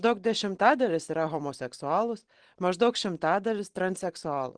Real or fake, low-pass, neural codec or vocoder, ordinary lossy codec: real; 9.9 kHz; none; Opus, 16 kbps